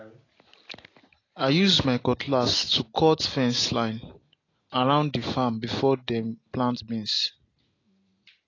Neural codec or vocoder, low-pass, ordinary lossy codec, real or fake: none; 7.2 kHz; AAC, 32 kbps; real